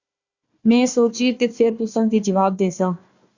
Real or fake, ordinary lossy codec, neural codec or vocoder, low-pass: fake; Opus, 64 kbps; codec, 16 kHz, 1 kbps, FunCodec, trained on Chinese and English, 50 frames a second; 7.2 kHz